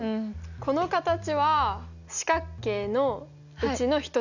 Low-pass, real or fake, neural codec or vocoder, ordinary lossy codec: 7.2 kHz; real; none; none